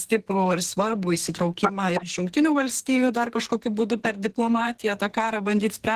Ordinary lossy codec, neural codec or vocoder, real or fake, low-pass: Opus, 16 kbps; codec, 44.1 kHz, 2.6 kbps, SNAC; fake; 14.4 kHz